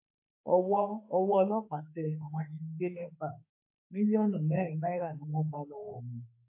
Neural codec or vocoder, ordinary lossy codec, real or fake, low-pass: autoencoder, 48 kHz, 32 numbers a frame, DAC-VAE, trained on Japanese speech; MP3, 24 kbps; fake; 3.6 kHz